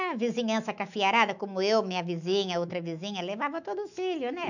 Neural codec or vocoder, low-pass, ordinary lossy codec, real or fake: autoencoder, 48 kHz, 128 numbers a frame, DAC-VAE, trained on Japanese speech; 7.2 kHz; none; fake